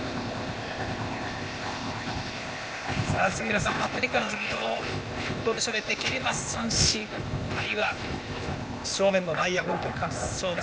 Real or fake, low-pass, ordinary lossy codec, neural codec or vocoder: fake; none; none; codec, 16 kHz, 0.8 kbps, ZipCodec